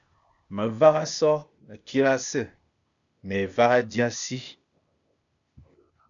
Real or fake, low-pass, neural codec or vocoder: fake; 7.2 kHz; codec, 16 kHz, 0.8 kbps, ZipCodec